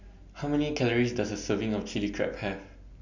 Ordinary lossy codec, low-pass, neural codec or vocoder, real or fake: none; 7.2 kHz; none; real